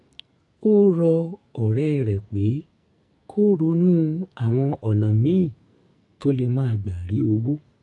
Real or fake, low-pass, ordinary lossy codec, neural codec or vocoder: fake; 10.8 kHz; AAC, 64 kbps; codec, 44.1 kHz, 2.6 kbps, SNAC